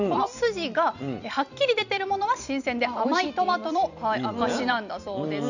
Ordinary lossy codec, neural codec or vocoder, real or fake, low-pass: none; vocoder, 44.1 kHz, 128 mel bands every 256 samples, BigVGAN v2; fake; 7.2 kHz